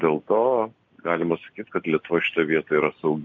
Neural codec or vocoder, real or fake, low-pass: none; real; 7.2 kHz